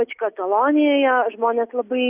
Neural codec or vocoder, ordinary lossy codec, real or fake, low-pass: none; Opus, 64 kbps; real; 3.6 kHz